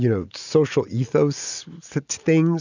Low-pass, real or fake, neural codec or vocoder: 7.2 kHz; real; none